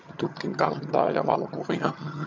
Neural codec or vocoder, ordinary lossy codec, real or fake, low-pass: vocoder, 22.05 kHz, 80 mel bands, HiFi-GAN; MP3, 64 kbps; fake; 7.2 kHz